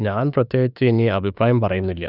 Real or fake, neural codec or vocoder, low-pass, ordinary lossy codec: fake; autoencoder, 48 kHz, 32 numbers a frame, DAC-VAE, trained on Japanese speech; 5.4 kHz; none